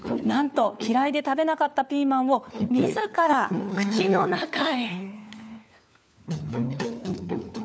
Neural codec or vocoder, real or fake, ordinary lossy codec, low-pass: codec, 16 kHz, 4 kbps, FunCodec, trained on LibriTTS, 50 frames a second; fake; none; none